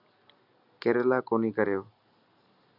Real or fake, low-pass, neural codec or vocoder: real; 5.4 kHz; none